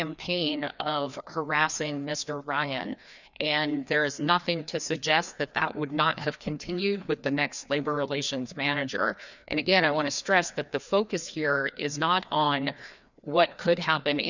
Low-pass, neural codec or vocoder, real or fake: 7.2 kHz; codec, 16 kHz, 2 kbps, FreqCodec, larger model; fake